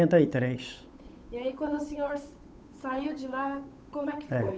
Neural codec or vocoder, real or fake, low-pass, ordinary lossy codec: codec, 16 kHz, 8 kbps, FunCodec, trained on Chinese and English, 25 frames a second; fake; none; none